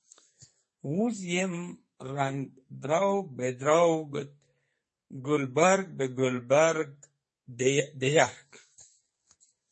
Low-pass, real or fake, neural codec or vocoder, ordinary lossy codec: 10.8 kHz; fake; codec, 44.1 kHz, 2.6 kbps, SNAC; MP3, 32 kbps